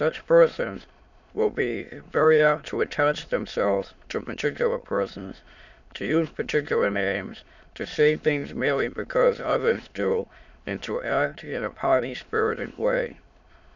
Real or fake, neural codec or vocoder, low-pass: fake; autoencoder, 22.05 kHz, a latent of 192 numbers a frame, VITS, trained on many speakers; 7.2 kHz